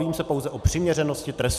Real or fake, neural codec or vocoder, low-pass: real; none; 14.4 kHz